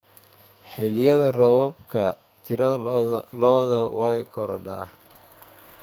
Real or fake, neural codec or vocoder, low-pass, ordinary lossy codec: fake; codec, 44.1 kHz, 2.6 kbps, SNAC; none; none